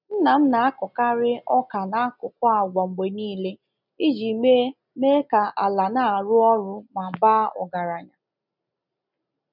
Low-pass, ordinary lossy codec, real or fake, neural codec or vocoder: 5.4 kHz; none; real; none